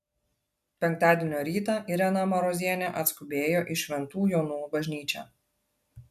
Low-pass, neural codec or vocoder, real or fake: 14.4 kHz; none; real